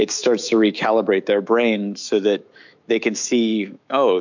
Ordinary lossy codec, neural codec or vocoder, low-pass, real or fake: MP3, 64 kbps; autoencoder, 48 kHz, 128 numbers a frame, DAC-VAE, trained on Japanese speech; 7.2 kHz; fake